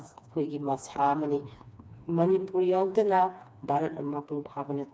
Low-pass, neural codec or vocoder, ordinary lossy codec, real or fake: none; codec, 16 kHz, 2 kbps, FreqCodec, smaller model; none; fake